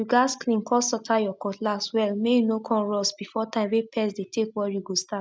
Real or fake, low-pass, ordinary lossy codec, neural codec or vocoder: real; none; none; none